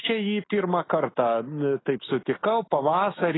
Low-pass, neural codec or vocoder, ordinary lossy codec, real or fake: 7.2 kHz; autoencoder, 48 kHz, 128 numbers a frame, DAC-VAE, trained on Japanese speech; AAC, 16 kbps; fake